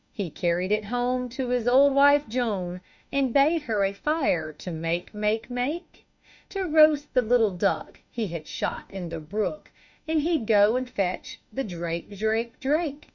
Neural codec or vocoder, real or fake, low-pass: autoencoder, 48 kHz, 32 numbers a frame, DAC-VAE, trained on Japanese speech; fake; 7.2 kHz